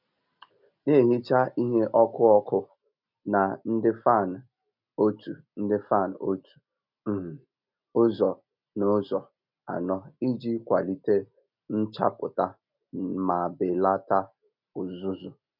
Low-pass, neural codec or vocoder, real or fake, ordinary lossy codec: 5.4 kHz; none; real; none